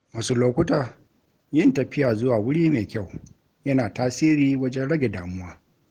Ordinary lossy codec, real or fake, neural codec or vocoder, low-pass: Opus, 16 kbps; real; none; 19.8 kHz